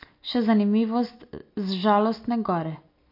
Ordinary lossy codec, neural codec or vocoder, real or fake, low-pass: MP3, 32 kbps; none; real; 5.4 kHz